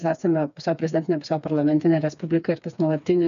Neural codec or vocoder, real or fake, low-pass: codec, 16 kHz, 4 kbps, FreqCodec, smaller model; fake; 7.2 kHz